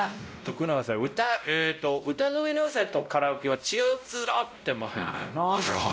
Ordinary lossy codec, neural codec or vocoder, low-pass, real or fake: none; codec, 16 kHz, 0.5 kbps, X-Codec, WavLM features, trained on Multilingual LibriSpeech; none; fake